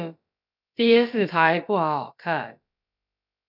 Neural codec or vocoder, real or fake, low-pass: codec, 16 kHz, about 1 kbps, DyCAST, with the encoder's durations; fake; 5.4 kHz